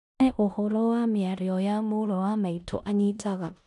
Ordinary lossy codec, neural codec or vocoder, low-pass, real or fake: none; codec, 16 kHz in and 24 kHz out, 0.9 kbps, LongCat-Audio-Codec, four codebook decoder; 10.8 kHz; fake